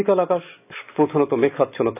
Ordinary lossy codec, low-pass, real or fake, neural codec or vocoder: none; 3.6 kHz; real; none